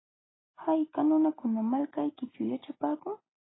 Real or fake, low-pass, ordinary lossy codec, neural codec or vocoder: real; 7.2 kHz; AAC, 16 kbps; none